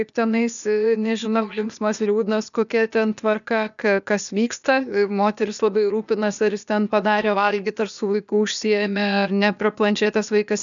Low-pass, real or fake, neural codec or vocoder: 7.2 kHz; fake; codec, 16 kHz, 0.8 kbps, ZipCodec